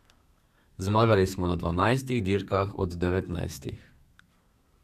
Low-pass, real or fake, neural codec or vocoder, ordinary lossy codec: 14.4 kHz; fake; codec, 32 kHz, 1.9 kbps, SNAC; none